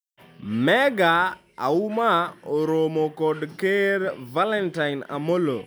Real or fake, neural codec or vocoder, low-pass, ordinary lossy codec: real; none; none; none